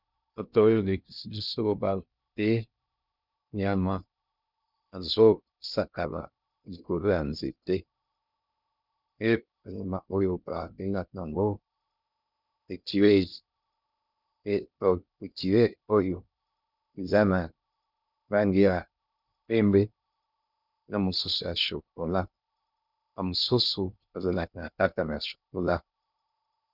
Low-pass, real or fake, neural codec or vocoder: 5.4 kHz; fake; codec, 16 kHz in and 24 kHz out, 0.6 kbps, FocalCodec, streaming, 2048 codes